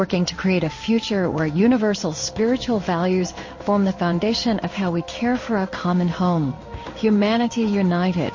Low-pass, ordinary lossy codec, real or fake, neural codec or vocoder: 7.2 kHz; MP3, 32 kbps; fake; codec, 16 kHz in and 24 kHz out, 1 kbps, XY-Tokenizer